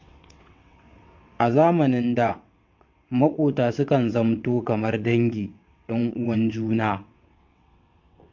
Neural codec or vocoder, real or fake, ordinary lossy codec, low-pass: vocoder, 22.05 kHz, 80 mel bands, WaveNeXt; fake; MP3, 48 kbps; 7.2 kHz